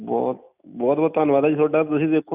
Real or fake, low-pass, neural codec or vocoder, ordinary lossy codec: real; 3.6 kHz; none; none